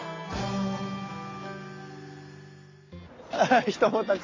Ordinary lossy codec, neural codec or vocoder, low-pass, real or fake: AAC, 32 kbps; none; 7.2 kHz; real